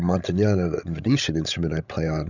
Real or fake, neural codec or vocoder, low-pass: fake; codec, 16 kHz, 16 kbps, FreqCodec, larger model; 7.2 kHz